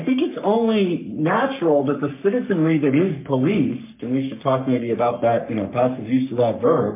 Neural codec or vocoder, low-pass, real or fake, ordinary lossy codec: codec, 44.1 kHz, 3.4 kbps, Pupu-Codec; 3.6 kHz; fake; MP3, 32 kbps